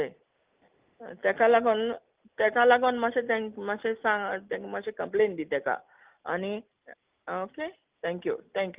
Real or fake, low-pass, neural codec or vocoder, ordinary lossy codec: real; 3.6 kHz; none; Opus, 32 kbps